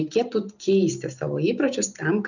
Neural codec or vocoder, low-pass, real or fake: none; 7.2 kHz; real